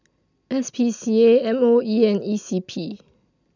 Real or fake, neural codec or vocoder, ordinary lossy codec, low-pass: real; none; none; 7.2 kHz